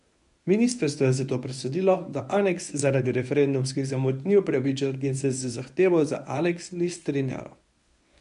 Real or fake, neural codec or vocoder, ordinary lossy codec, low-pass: fake; codec, 24 kHz, 0.9 kbps, WavTokenizer, medium speech release version 1; none; 10.8 kHz